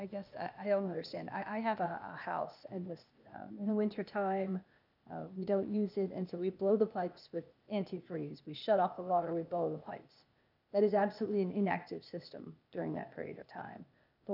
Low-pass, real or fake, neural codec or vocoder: 5.4 kHz; fake; codec, 16 kHz, 0.8 kbps, ZipCodec